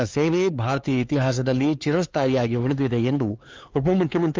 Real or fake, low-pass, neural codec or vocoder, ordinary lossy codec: fake; 7.2 kHz; codec, 16 kHz, 4 kbps, X-Codec, HuBERT features, trained on LibriSpeech; Opus, 32 kbps